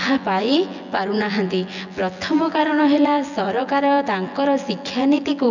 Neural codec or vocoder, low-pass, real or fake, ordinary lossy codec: vocoder, 24 kHz, 100 mel bands, Vocos; 7.2 kHz; fake; none